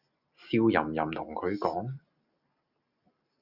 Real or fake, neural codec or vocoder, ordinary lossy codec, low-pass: real; none; Opus, 64 kbps; 5.4 kHz